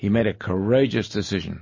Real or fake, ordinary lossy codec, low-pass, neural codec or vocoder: real; MP3, 32 kbps; 7.2 kHz; none